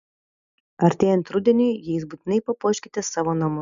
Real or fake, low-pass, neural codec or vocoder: real; 7.2 kHz; none